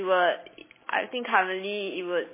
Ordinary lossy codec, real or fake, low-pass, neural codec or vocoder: MP3, 16 kbps; real; 3.6 kHz; none